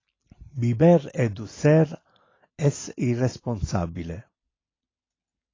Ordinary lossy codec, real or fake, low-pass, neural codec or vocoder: AAC, 32 kbps; real; 7.2 kHz; none